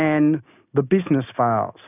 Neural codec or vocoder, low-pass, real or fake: none; 3.6 kHz; real